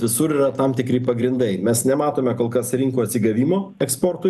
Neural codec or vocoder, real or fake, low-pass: none; real; 14.4 kHz